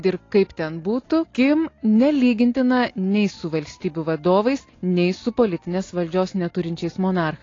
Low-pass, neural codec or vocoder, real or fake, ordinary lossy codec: 7.2 kHz; none; real; AAC, 32 kbps